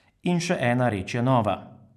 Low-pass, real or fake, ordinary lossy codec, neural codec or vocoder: 14.4 kHz; real; none; none